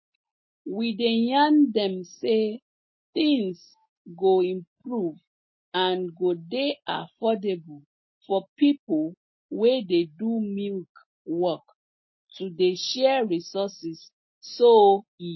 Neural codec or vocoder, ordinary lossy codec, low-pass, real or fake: none; MP3, 24 kbps; 7.2 kHz; real